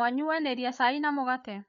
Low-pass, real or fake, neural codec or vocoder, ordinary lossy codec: 7.2 kHz; fake; codec, 16 kHz, 8 kbps, FreqCodec, larger model; MP3, 96 kbps